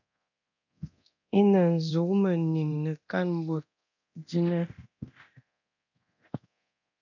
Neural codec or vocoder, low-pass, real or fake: codec, 24 kHz, 0.9 kbps, DualCodec; 7.2 kHz; fake